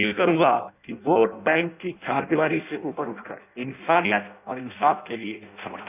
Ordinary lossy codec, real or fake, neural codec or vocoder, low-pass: none; fake; codec, 16 kHz in and 24 kHz out, 0.6 kbps, FireRedTTS-2 codec; 3.6 kHz